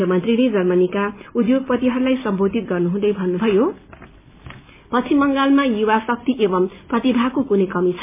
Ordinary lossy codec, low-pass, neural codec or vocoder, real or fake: MP3, 24 kbps; 3.6 kHz; none; real